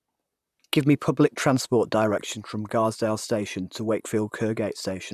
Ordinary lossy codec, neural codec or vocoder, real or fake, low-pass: none; vocoder, 44.1 kHz, 128 mel bands, Pupu-Vocoder; fake; 14.4 kHz